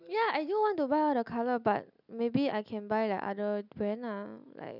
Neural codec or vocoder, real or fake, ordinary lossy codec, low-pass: none; real; none; 5.4 kHz